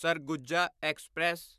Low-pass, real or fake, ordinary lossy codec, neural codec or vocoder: 14.4 kHz; fake; none; vocoder, 44.1 kHz, 128 mel bands, Pupu-Vocoder